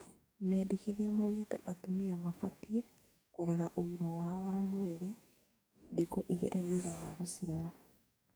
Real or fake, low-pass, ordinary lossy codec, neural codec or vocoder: fake; none; none; codec, 44.1 kHz, 2.6 kbps, DAC